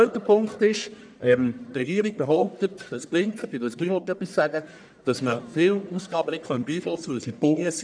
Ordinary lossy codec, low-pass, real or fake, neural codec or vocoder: none; 9.9 kHz; fake; codec, 44.1 kHz, 1.7 kbps, Pupu-Codec